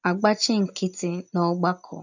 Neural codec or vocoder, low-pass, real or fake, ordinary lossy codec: none; 7.2 kHz; real; none